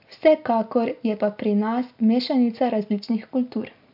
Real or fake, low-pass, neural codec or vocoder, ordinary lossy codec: real; 5.4 kHz; none; MP3, 48 kbps